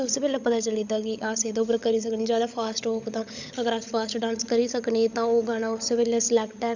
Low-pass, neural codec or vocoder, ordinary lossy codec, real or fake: 7.2 kHz; codec, 16 kHz, 16 kbps, FunCodec, trained on LibriTTS, 50 frames a second; none; fake